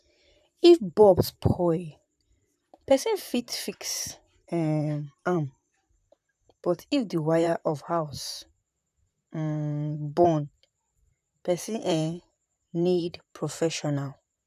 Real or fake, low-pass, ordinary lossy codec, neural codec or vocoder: fake; 14.4 kHz; none; vocoder, 44.1 kHz, 128 mel bands, Pupu-Vocoder